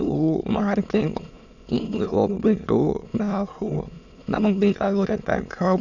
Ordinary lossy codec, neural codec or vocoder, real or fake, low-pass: none; autoencoder, 22.05 kHz, a latent of 192 numbers a frame, VITS, trained on many speakers; fake; 7.2 kHz